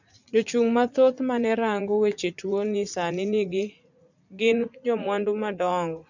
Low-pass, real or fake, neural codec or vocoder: 7.2 kHz; fake; vocoder, 44.1 kHz, 80 mel bands, Vocos